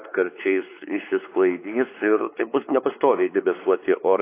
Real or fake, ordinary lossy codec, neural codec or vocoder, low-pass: fake; AAC, 24 kbps; codec, 16 kHz, 4 kbps, X-Codec, WavLM features, trained on Multilingual LibriSpeech; 3.6 kHz